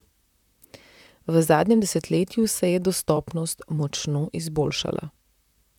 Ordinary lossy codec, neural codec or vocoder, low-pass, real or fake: none; vocoder, 44.1 kHz, 128 mel bands, Pupu-Vocoder; 19.8 kHz; fake